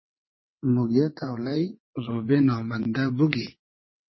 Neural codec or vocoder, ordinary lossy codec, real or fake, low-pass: vocoder, 22.05 kHz, 80 mel bands, WaveNeXt; MP3, 24 kbps; fake; 7.2 kHz